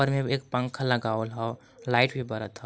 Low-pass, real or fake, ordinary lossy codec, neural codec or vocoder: none; real; none; none